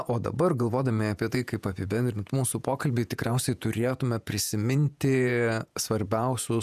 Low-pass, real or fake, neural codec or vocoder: 14.4 kHz; fake; vocoder, 48 kHz, 128 mel bands, Vocos